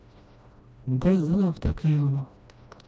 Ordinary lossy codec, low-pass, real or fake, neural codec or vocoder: none; none; fake; codec, 16 kHz, 1 kbps, FreqCodec, smaller model